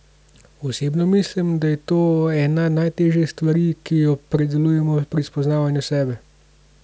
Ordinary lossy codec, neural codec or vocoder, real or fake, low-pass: none; none; real; none